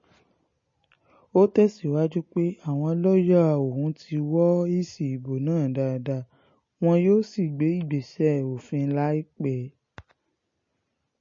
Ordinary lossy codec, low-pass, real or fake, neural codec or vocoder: MP3, 32 kbps; 7.2 kHz; real; none